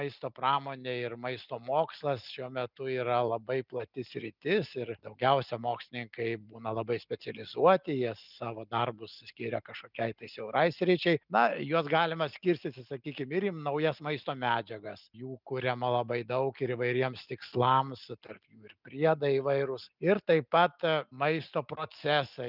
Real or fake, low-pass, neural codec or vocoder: real; 5.4 kHz; none